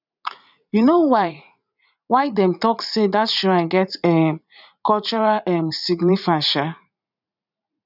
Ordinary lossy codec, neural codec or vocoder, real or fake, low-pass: none; vocoder, 44.1 kHz, 80 mel bands, Vocos; fake; 5.4 kHz